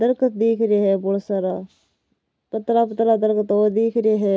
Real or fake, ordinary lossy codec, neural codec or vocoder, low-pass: real; none; none; none